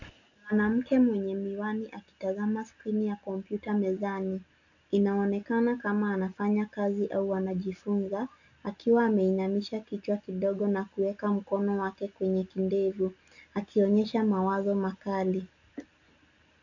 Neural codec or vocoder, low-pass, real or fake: none; 7.2 kHz; real